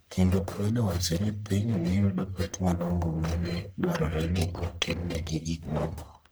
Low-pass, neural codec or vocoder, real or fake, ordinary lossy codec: none; codec, 44.1 kHz, 1.7 kbps, Pupu-Codec; fake; none